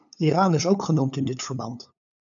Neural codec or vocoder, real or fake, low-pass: codec, 16 kHz, 8 kbps, FunCodec, trained on LibriTTS, 25 frames a second; fake; 7.2 kHz